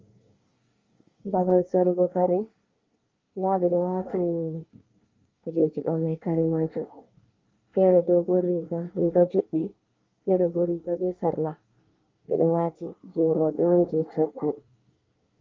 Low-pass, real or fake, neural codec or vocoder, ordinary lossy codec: 7.2 kHz; fake; codec, 24 kHz, 1 kbps, SNAC; Opus, 32 kbps